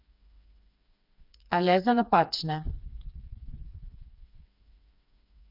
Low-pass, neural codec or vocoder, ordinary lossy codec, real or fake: 5.4 kHz; codec, 16 kHz, 4 kbps, FreqCodec, smaller model; none; fake